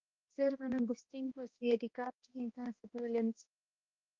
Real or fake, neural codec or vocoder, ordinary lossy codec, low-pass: fake; codec, 16 kHz, 1 kbps, X-Codec, HuBERT features, trained on balanced general audio; Opus, 32 kbps; 7.2 kHz